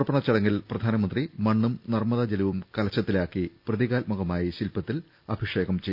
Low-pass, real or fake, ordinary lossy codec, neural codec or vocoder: 5.4 kHz; real; none; none